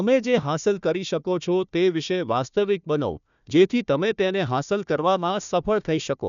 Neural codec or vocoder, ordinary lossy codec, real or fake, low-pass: codec, 16 kHz, 1 kbps, FunCodec, trained on Chinese and English, 50 frames a second; none; fake; 7.2 kHz